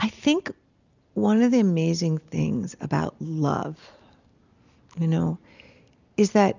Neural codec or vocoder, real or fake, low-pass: none; real; 7.2 kHz